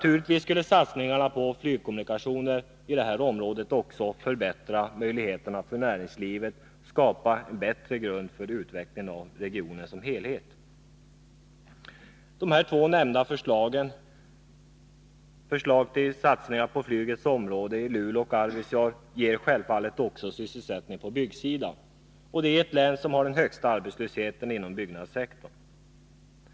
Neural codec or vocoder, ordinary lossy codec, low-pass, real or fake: none; none; none; real